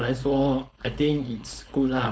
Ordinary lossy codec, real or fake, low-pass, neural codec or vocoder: none; fake; none; codec, 16 kHz, 4.8 kbps, FACodec